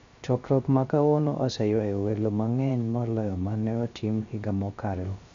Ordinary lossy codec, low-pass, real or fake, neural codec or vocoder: MP3, 64 kbps; 7.2 kHz; fake; codec, 16 kHz, 0.3 kbps, FocalCodec